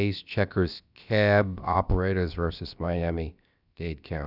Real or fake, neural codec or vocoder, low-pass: fake; codec, 16 kHz, about 1 kbps, DyCAST, with the encoder's durations; 5.4 kHz